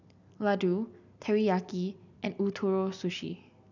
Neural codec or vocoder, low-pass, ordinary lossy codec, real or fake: none; 7.2 kHz; none; real